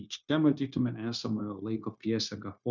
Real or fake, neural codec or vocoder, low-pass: fake; codec, 16 kHz, 0.9 kbps, LongCat-Audio-Codec; 7.2 kHz